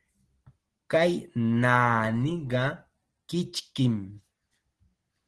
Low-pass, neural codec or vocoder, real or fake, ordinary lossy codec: 10.8 kHz; none; real; Opus, 16 kbps